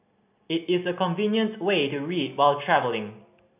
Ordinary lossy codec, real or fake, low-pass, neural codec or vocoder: AAC, 32 kbps; real; 3.6 kHz; none